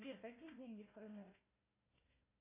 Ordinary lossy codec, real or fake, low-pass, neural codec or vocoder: AAC, 32 kbps; fake; 3.6 kHz; codec, 16 kHz, 1 kbps, FunCodec, trained on Chinese and English, 50 frames a second